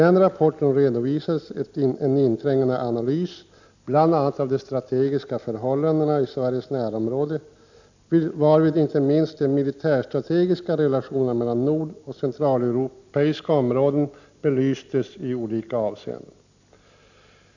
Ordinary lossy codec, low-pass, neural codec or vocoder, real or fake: none; 7.2 kHz; none; real